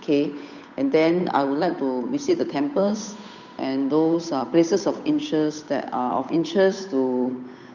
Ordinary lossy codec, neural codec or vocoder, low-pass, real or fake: none; codec, 16 kHz, 8 kbps, FunCodec, trained on Chinese and English, 25 frames a second; 7.2 kHz; fake